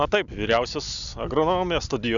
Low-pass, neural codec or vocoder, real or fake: 7.2 kHz; none; real